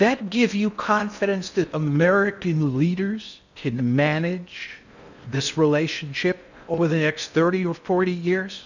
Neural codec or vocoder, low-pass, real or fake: codec, 16 kHz in and 24 kHz out, 0.6 kbps, FocalCodec, streaming, 4096 codes; 7.2 kHz; fake